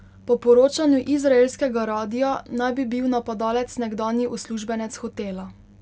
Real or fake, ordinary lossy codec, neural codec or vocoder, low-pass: real; none; none; none